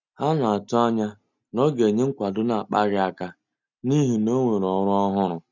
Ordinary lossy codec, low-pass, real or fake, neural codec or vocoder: none; 7.2 kHz; real; none